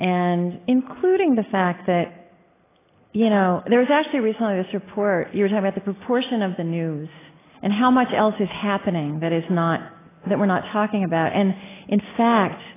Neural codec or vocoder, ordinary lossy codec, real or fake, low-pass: none; AAC, 16 kbps; real; 3.6 kHz